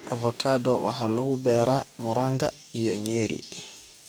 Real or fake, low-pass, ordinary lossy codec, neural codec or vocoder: fake; none; none; codec, 44.1 kHz, 2.6 kbps, DAC